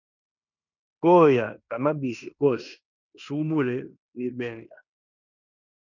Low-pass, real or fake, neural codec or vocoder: 7.2 kHz; fake; codec, 16 kHz in and 24 kHz out, 0.9 kbps, LongCat-Audio-Codec, fine tuned four codebook decoder